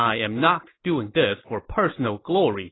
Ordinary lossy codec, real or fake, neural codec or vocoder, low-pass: AAC, 16 kbps; real; none; 7.2 kHz